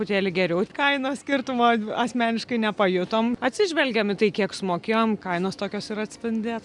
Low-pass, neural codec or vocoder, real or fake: 10.8 kHz; none; real